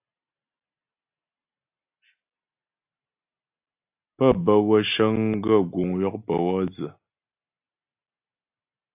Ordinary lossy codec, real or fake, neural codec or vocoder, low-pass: AAC, 32 kbps; real; none; 3.6 kHz